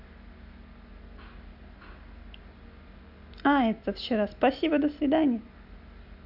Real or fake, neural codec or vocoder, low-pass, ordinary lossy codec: real; none; 5.4 kHz; none